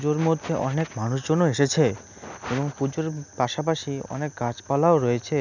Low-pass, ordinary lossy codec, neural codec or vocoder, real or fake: 7.2 kHz; none; none; real